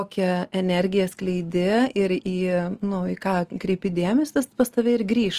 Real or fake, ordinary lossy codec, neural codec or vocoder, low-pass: real; Opus, 24 kbps; none; 14.4 kHz